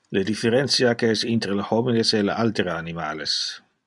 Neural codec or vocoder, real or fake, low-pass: none; real; 10.8 kHz